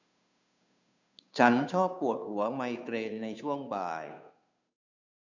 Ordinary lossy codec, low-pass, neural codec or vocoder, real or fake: none; 7.2 kHz; codec, 16 kHz, 2 kbps, FunCodec, trained on Chinese and English, 25 frames a second; fake